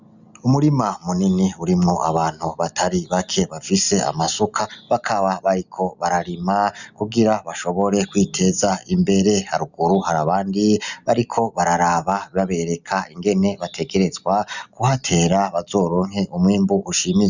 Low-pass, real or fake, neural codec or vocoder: 7.2 kHz; real; none